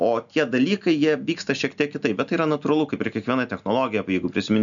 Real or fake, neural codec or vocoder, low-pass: real; none; 7.2 kHz